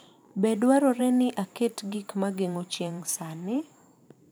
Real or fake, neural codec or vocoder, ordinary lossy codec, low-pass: fake; vocoder, 44.1 kHz, 128 mel bands every 512 samples, BigVGAN v2; none; none